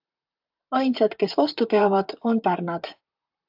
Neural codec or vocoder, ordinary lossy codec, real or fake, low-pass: vocoder, 44.1 kHz, 128 mel bands, Pupu-Vocoder; AAC, 48 kbps; fake; 5.4 kHz